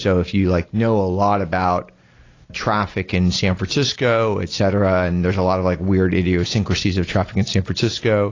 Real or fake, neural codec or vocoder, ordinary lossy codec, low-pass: real; none; AAC, 32 kbps; 7.2 kHz